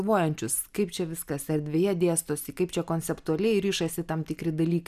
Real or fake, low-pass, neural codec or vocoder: real; 14.4 kHz; none